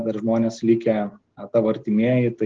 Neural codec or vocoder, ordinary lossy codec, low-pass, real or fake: none; Opus, 16 kbps; 7.2 kHz; real